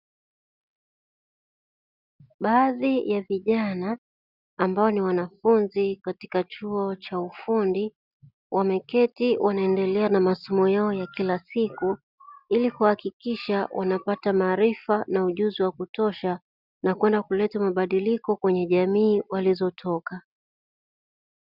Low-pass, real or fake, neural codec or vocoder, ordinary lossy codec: 5.4 kHz; real; none; Opus, 64 kbps